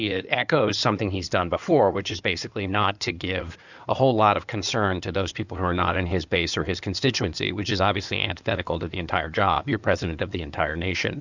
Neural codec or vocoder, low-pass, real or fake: codec, 16 kHz in and 24 kHz out, 2.2 kbps, FireRedTTS-2 codec; 7.2 kHz; fake